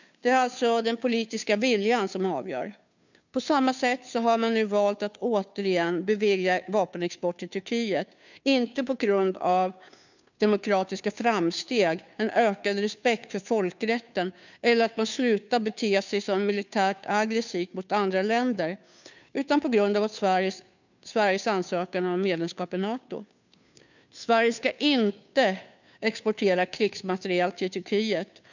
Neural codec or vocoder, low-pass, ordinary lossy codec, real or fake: codec, 16 kHz, 2 kbps, FunCodec, trained on Chinese and English, 25 frames a second; 7.2 kHz; none; fake